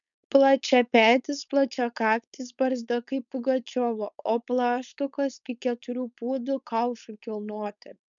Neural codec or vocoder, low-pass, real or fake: codec, 16 kHz, 4.8 kbps, FACodec; 7.2 kHz; fake